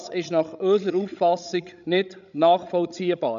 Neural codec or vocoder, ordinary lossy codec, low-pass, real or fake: codec, 16 kHz, 16 kbps, FreqCodec, larger model; none; 7.2 kHz; fake